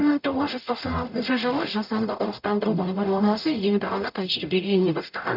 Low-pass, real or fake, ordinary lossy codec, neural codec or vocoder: 5.4 kHz; fake; none; codec, 44.1 kHz, 0.9 kbps, DAC